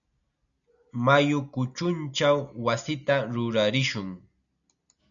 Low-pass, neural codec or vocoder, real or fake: 7.2 kHz; none; real